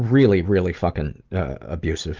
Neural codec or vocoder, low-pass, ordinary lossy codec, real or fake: none; 7.2 kHz; Opus, 24 kbps; real